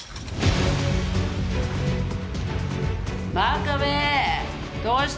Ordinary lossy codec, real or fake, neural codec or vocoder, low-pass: none; real; none; none